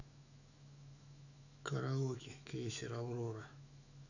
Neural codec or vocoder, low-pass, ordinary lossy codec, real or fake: autoencoder, 48 kHz, 128 numbers a frame, DAC-VAE, trained on Japanese speech; 7.2 kHz; none; fake